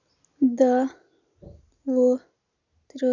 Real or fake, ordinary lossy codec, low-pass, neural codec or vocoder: real; none; 7.2 kHz; none